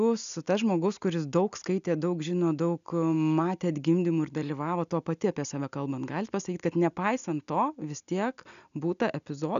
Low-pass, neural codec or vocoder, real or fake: 7.2 kHz; none; real